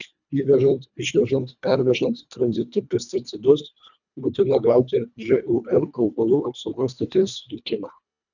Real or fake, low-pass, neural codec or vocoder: fake; 7.2 kHz; codec, 24 kHz, 1.5 kbps, HILCodec